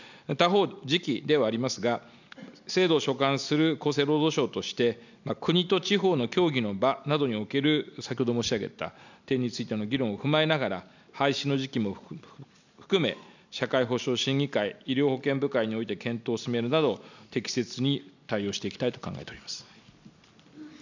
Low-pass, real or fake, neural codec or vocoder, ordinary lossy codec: 7.2 kHz; real; none; none